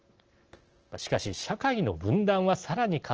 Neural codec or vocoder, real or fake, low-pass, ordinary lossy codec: none; real; 7.2 kHz; Opus, 16 kbps